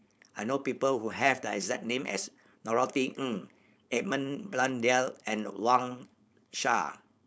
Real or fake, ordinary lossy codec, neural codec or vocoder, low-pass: fake; none; codec, 16 kHz, 4.8 kbps, FACodec; none